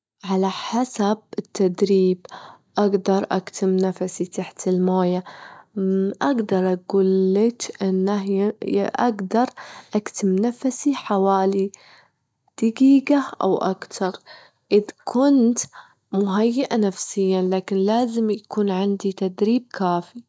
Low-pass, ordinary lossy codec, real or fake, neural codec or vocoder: none; none; real; none